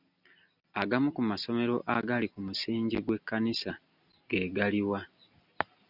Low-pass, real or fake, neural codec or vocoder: 5.4 kHz; real; none